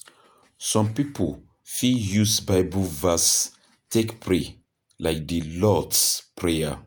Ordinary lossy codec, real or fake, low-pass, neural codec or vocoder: none; fake; none; vocoder, 48 kHz, 128 mel bands, Vocos